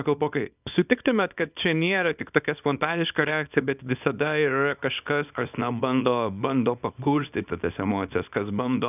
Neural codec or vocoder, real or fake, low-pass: codec, 24 kHz, 0.9 kbps, WavTokenizer, small release; fake; 3.6 kHz